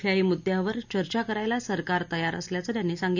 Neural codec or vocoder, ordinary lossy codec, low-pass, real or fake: none; none; 7.2 kHz; real